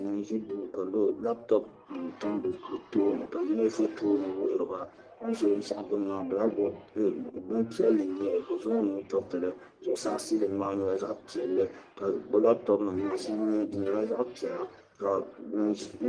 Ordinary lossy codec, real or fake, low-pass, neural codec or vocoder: Opus, 24 kbps; fake; 9.9 kHz; codec, 44.1 kHz, 1.7 kbps, Pupu-Codec